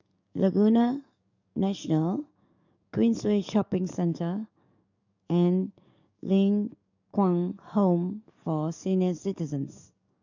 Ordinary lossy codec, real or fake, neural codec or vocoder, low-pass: none; fake; codec, 44.1 kHz, 7.8 kbps, DAC; 7.2 kHz